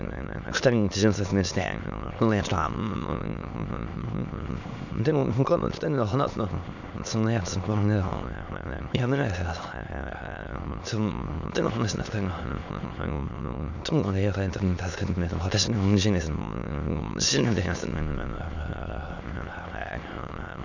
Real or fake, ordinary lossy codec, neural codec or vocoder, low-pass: fake; none; autoencoder, 22.05 kHz, a latent of 192 numbers a frame, VITS, trained on many speakers; 7.2 kHz